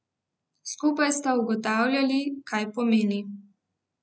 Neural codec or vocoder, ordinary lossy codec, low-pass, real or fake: none; none; none; real